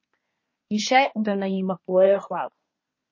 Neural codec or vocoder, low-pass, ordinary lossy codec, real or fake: codec, 24 kHz, 1 kbps, SNAC; 7.2 kHz; MP3, 32 kbps; fake